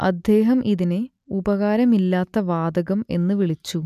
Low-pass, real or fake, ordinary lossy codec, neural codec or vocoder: 14.4 kHz; real; none; none